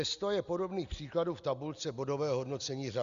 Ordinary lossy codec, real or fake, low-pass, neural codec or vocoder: Opus, 64 kbps; real; 7.2 kHz; none